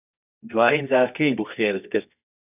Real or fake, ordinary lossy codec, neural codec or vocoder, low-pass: fake; AAC, 24 kbps; codec, 24 kHz, 0.9 kbps, WavTokenizer, medium music audio release; 3.6 kHz